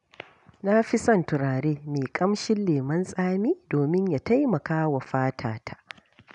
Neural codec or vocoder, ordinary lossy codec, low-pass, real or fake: none; none; 10.8 kHz; real